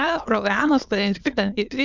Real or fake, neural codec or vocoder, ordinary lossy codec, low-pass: fake; autoencoder, 22.05 kHz, a latent of 192 numbers a frame, VITS, trained on many speakers; Opus, 64 kbps; 7.2 kHz